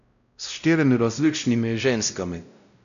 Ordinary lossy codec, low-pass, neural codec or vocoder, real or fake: none; 7.2 kHz; codec, 16 kHz, 0.5 kbps, X-Codec, WavLM features, trained on Multilingual LibriSpeech; fake